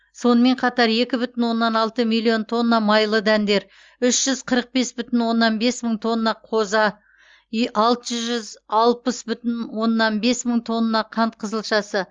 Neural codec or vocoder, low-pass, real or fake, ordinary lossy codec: none; 7.2 kHz; real; Opus, 32 kbps